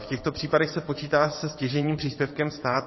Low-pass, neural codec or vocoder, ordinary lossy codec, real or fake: 7.2 kHz; vocoder, 22.05 kHz, 80 mel bands, WaveNeXt; MP3, 24 kbps; fake